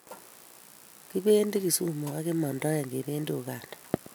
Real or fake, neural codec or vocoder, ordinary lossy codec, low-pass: real; none; none; none